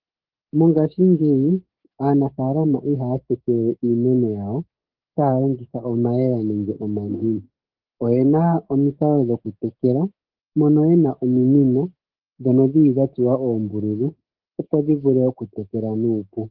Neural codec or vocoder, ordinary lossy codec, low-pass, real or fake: codec, 16 kHz, 6 kbps, DAC; Opus, 16 kbps; 5.4 kHz; fake